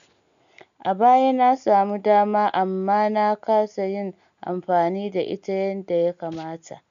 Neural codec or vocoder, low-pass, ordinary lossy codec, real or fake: none; 7.2 kHz; none; real